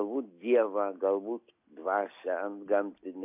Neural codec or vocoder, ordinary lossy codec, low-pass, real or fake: none; AAC, 32 kbps; 3.6 kHz; real